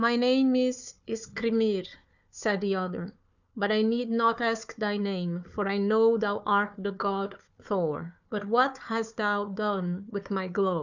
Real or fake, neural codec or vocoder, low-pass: fake; codec, 16 kHz, 4 kbps, FunCodec, trained on Chinese and English, 50 frames a second; 7.2 kHz